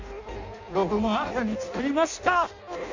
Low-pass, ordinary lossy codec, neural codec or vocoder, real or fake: 7.2 kHz; MP3, 48 kbps; codec, 16 kHz in and 24 kHz out, 0.6 kbps, FireRedTTS-2 codec; fake